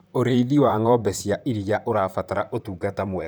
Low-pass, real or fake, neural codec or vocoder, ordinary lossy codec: none; fake; vocoder, 44.1 kHz, 128 mel bands, Pupu-Vocoder; none